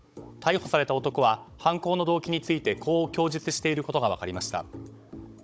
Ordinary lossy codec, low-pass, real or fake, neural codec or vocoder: none; none; fake; codec, 16 kHz, 16 kbps, FunCodec, trained on Chinese and English, 50 frames a second